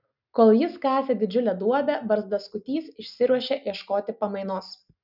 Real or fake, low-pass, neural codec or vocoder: real; 5.4 kHz; none